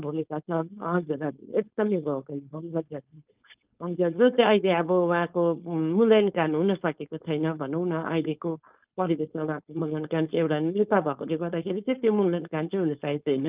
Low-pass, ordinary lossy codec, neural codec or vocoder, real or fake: 3.6 kHz; Opus, 24 kbps; codec, 16 kHz, 4.8 kbps, FACodec; fake